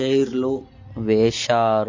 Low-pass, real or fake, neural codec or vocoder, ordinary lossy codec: 7.2 kHz; real; none; MP3, 32 kbps